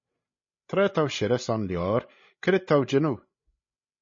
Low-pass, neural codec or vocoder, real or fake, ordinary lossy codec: 7.2 kHz; codec, 16 kHz, 16 kbps, FreqCodec, larger model; fake; MP3, 32 kbps